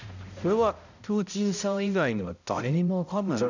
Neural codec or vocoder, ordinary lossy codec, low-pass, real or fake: codec, 16 kHz, 0.5 kbps, X-Codec, HuBERT features, trained on balanced general audio; none; 7.2 kHz; fake